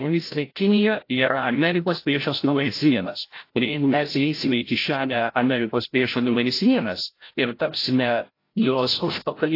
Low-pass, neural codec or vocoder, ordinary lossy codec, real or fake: 5.4 kHz; codec, 16 kHz, 0.5 kbps, FreqCodec, larger model; AAC, 32 kbps; fake